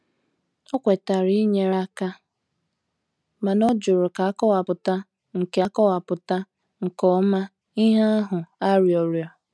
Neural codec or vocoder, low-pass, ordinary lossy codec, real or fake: none; none; none; real